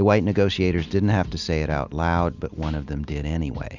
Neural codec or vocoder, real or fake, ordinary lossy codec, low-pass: none; real; Opus, 64 kbps; 7.2 kHz